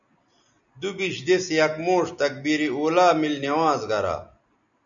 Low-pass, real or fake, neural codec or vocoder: 7.2 kHz; real; none